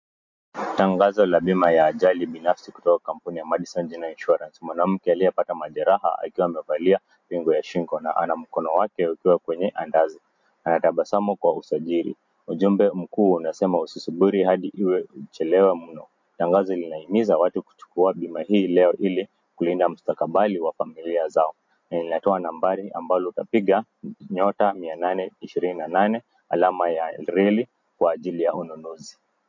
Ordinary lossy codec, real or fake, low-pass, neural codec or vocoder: MP3, 48 kbps; real; 7.2 kHz; none